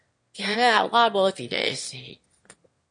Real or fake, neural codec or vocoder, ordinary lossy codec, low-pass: fake; autoencoder, 22.05 kHz, a latent of 192 numbers a frame, VITS, trained on one speaker; MP3, 48 kbps; 9.9 kHz